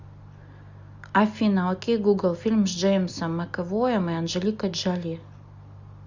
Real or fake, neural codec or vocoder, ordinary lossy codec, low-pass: real; none; Opus, 64 kbps; 7.2 kHz